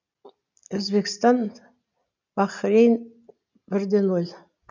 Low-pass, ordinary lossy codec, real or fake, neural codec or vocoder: 7.2 kHz; none; real; none